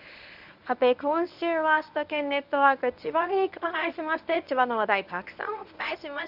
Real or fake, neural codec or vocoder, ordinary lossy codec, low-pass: fake; codec, 24 kHz, 0.9 kbps, WavTokenizer, medium speech release version 1; none; 5.4 kHz